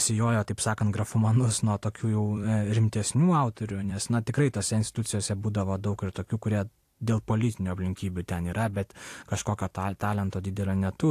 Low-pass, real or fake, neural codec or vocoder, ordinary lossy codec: 14.4 kHz; fake; vocoder, 44.1 kHz, 128 mel bands every 256 samples, BigVGAN v2; AAC, 64 kbps